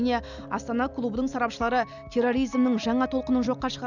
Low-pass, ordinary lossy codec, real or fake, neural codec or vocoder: 7.2 kHz; none; real; none